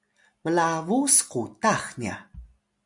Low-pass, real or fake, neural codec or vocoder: 10.8 kHz; real; none